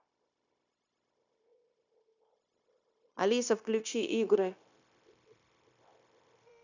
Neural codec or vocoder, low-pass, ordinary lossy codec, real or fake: codec, 16 kHz, 0.9 kbps, LongCat-Audio-Codec; 7.2 kHz; none; fake